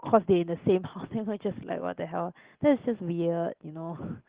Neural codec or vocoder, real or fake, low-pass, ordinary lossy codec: none; real; 3.6 kHz; Opus, 16 kbps